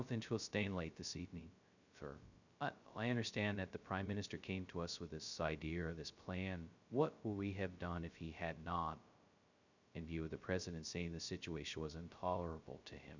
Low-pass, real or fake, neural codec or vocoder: 7.2 kHz; fake; codec, 16 kHz, 0.2 kbps, FocalCodec